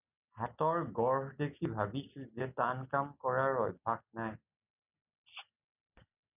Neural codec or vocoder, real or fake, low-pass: none; real; 3.6 kHz